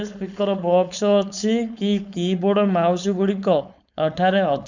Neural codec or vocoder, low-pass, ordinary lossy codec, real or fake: codec, 16 kHz, 4.8 kbps, FACodec; 7.2 kHz; none; fake